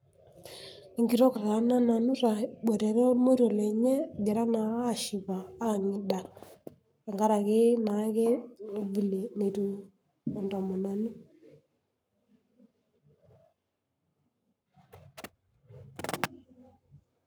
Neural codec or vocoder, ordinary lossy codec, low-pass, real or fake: codec, 44.1 kHz, 7.8 kbps, Pupu-Codec; none; none; fake